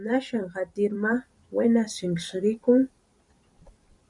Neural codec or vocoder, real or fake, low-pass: vocoder, 44.1 kHz, 128 mel bands every 512 samples, BigVGAN v2; fake; 10.8 kHz